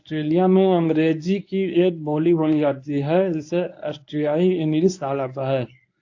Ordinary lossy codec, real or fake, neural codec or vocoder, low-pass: AAC, 48 kbps; fake; codec, 24 kHz, 0.9 kbps, WavTokenizer, medium speech release version 1; 7.2 kHz